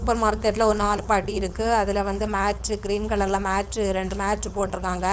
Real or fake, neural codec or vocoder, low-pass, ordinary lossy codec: fake; codec, 16 kHz, 4.8 kbps, FACodec; none; none